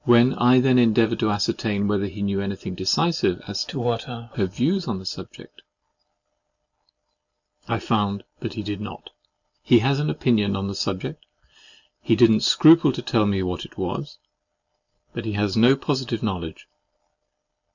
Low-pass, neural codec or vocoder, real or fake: 7.2 kHz; none; real